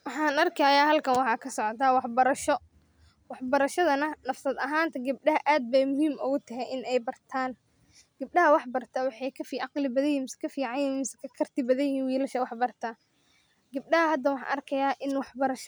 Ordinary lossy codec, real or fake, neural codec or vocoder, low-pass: none; real; none; none